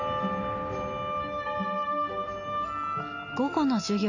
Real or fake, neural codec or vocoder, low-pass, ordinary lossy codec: real; none; 7.2 kHz; none